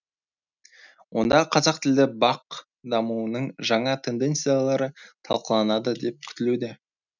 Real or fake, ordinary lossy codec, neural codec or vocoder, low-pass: real; none; none; 7.2 kHz